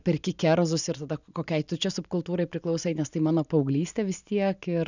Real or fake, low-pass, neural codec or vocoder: real; 7.2 kHz; none